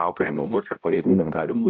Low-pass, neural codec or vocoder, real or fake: 7.2 kHz; codec, 16 kHz, 0.5 kbps, X-Codec, HuBERT features, trained on balanced general audio; fake